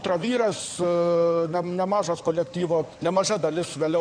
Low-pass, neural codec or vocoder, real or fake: 9.9 kHz; codec, 16 kHz in and 24 kHz out, 2.2 kbps, FireRedTTS-2 codec; fake